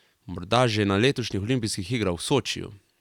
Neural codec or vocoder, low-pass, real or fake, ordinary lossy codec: none; 19.8 kHz; real; none